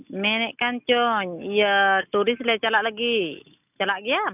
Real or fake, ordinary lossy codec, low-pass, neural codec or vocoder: real; none; 3.6 kHz; none